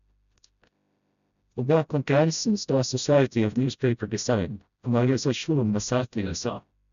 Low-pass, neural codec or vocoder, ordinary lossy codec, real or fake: 7.2 kHz; codec, 16 kHz, 0.5 kbps, FreqCodec, smaller model; none; fake